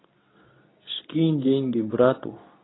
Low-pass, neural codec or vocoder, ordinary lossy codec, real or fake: 7.2 kHz; codec, 24 kHz, 0.9 kbps, WavTokenizer, medium speech release version 2; AAC, 16 kbps; fake